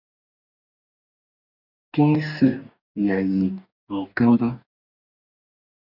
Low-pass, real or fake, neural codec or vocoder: 5.4 kHz; fake; codec, 44.1 kHz, 2.6 kbps, DAC